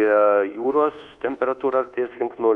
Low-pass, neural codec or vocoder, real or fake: 10.8 kHz; codec, 24 kHz, 1.2 kbps, DualCodec; fake